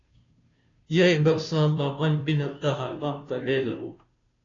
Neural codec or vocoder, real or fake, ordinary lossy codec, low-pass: codec, 16 kHz, 0.5 kbps, FunCodec, trained on Chinese and English, 25 frames a second; fake; AAC, 32 kbps; 7.2 kHz